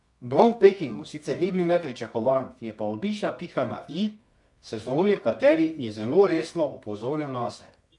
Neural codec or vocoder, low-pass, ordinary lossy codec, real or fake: codec, 24 kHz, 0.9 kbps, WavTokenizer, medium music audio release; 10.8 kHz; none; fake